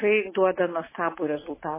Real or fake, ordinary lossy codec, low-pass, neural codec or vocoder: real; MP3, 16 kbps; 3.6 kHz; none